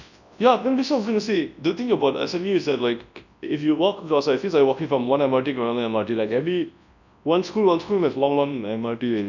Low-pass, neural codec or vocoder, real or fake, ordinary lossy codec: 7.2 kHz; codec, 24 kHz, 0.9 kbps, WavTokenizer, large speech release; fake; none